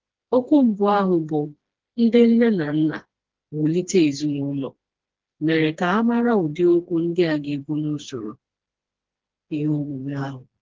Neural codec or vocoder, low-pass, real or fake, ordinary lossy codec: codec, 16 kHz, 2 kbps, FreqCodec, smaller model; 7.2 kHz; fake; Opus, 16 kbps